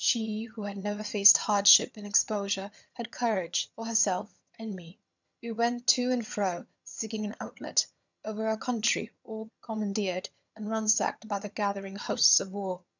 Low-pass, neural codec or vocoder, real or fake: 7.2 kHz; vocoder, 22.05 kHz, 80 mel bands, HiFi-GAN; fake